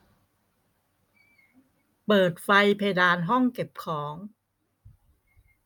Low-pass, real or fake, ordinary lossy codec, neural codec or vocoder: 19.8 kHz; real; none; none